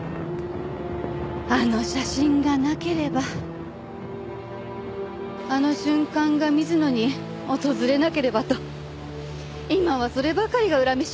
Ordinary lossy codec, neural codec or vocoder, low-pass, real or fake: none; none; none; real